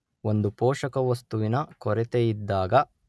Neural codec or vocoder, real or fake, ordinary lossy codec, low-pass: none; real; none; none